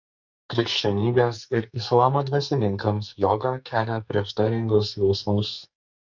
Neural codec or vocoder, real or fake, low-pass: codec, 44.1 kHz, 2.6 kbps, SNAC; fake; 7.2 kHz